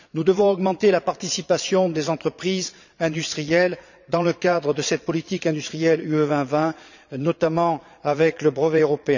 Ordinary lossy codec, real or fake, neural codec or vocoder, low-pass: none; fake; vocoder, 22.05 kHz, 80 mel bands, Vocos; 7.2 kHz